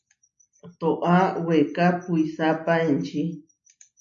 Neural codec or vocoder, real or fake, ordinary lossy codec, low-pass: none; real; AAC, 64 kbps; 7.2 kHz